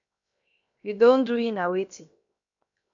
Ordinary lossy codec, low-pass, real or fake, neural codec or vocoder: MP3, 96 kbps; 7.2 kHz; fake; codec, 16 kHz, 0.7 kbps, FocalCodec